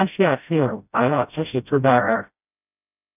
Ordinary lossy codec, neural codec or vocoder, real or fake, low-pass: none; codec, 16 kHz, 0.5 kbps, FreqCodec, smaller model; fake; 3.6 kHz